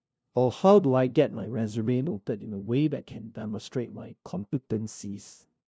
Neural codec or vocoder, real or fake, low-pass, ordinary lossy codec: codec, 16 kHz, 0.5 kbps, FunCodec, trained on LibriTTS, 25 frames a second; fake; none; none